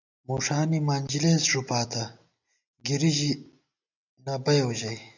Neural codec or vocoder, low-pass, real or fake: none; 7.2 kHz; real